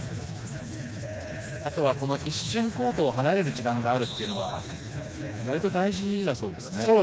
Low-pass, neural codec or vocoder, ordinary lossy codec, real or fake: none; codec, 16 kHz, 2 kbps, FreqCodec, smaller model; none; fake